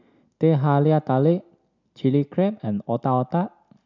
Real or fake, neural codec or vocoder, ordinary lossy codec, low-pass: real; none; none; 7.2 kHz